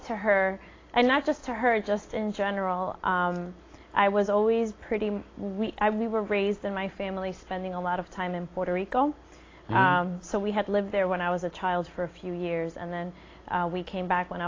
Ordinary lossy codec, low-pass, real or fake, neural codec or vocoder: AAC, 32 kbps; 7.2 kHz; real; none